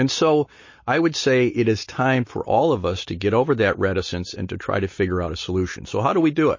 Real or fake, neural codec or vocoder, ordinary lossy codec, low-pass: real; none; MP3, 32 kbps; 7.2 kHz